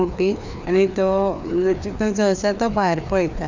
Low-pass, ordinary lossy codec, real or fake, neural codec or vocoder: 7.2 kHz; none; fake; codec, 16 kHz, 2 kbps, FreqCodec, larger model